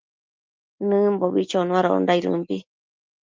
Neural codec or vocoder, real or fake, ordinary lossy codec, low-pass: none; real; Opus, 32 kbps; 7.2 kHz